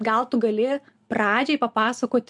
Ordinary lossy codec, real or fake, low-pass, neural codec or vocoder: MP3, 64 kbps; real; 10.8 kHz; none